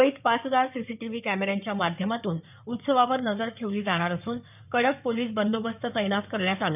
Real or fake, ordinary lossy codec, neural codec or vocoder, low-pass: fake; none; codec, 16 kHz in and 24 kHz out, 2.2 kbps, FireRedTTS-2 codec; 3.6 kHz